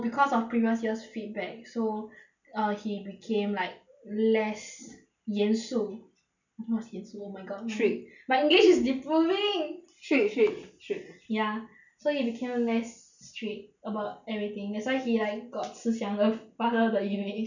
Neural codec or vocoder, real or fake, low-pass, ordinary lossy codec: none; real; 7.2 kHz; none